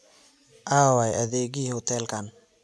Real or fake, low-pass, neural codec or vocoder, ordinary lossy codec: real; none; none; none